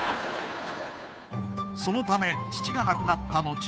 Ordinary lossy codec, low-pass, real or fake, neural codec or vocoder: none; none; fake; codec, 16 kHz, 2 kbps, FunCodec, trained on Chinese and English, 25 frames a second